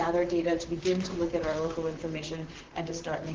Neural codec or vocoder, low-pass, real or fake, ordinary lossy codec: vocoder, 44.1 kHz, 128 mel bands, Pupu-Vocoder; 7.2 kHz; fake; Opus, 16 kbps